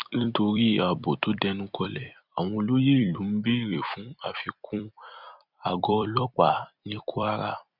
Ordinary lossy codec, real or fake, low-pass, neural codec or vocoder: none; fake; 5.4 kHz; vocoder, 44.1 kHz, 128 mel bands every 256 samples, BigVGAN v2